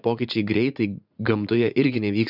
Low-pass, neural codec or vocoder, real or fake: 5.4 kHz; vocoder, 44.1 kHz, 80 mel bands, Vocos; fake